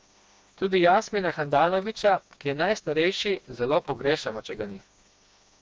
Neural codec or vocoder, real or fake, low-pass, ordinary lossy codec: codec, 16 kHz, 2 kbps, FreqCodec, smaller model; fake; none; none